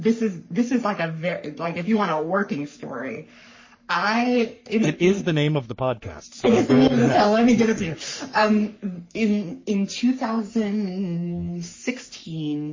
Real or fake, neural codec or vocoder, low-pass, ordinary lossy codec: fake; codec, 44.1 kHz, 3.4 kbps, Pupu-Codec; 7.2 kHz; MP3, 32 kbps